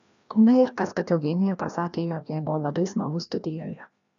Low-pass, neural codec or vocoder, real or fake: 7.2 kHz; codec, 16 kHz, 1 kbps, FreqCodec, larger model; fake